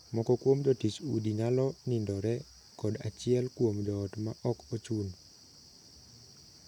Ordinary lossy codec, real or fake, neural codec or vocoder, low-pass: none; real; none; 19.8 kHz